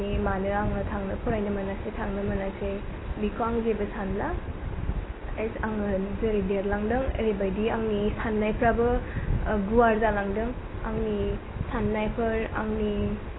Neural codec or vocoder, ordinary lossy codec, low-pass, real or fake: none; AAC, 16 kbps; 7.2 kHz; real